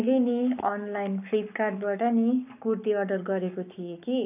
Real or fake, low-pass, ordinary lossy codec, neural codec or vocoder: fake; 3.6 kHz; none; codec, 44.1 kHz, 7.8 kbps, Pupu-Codec